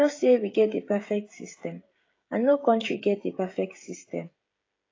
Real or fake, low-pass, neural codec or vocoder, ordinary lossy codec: fake; 7.2 kHz; codec, 16 kHz, 16 kbps, FreqCodec, smaller model; AAC, 32 kbps